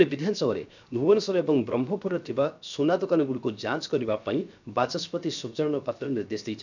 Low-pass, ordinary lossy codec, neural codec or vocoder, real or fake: 7.2 kHz; none; codec, 16 kHz, 0.7 kbps, FocalCodec; fake